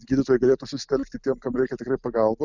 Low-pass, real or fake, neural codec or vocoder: 7.2 kHz; real; none